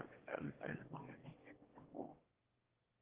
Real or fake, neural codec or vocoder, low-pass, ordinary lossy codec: fake; autoencoder, 22.05 kHz, a latent of 192 numbers a frame, VITS, trained on one speaker; 3.6 kHz; Opus, 16 kbps